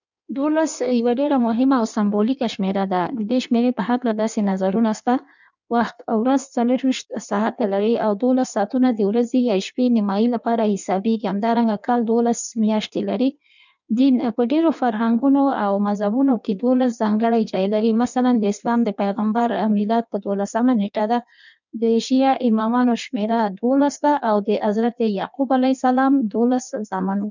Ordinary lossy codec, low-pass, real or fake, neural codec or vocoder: none; 7.2 kHz; fake; codec, 16 kHz in and 24 kHz out, 1.1 kbps, FireRedTTS-2 codec